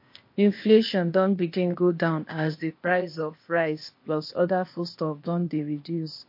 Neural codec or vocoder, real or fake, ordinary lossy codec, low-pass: codec, 16 kHz, 0.8 kbps, ZipCodec; fake; MP3, 32 kbps; 5.4 kHz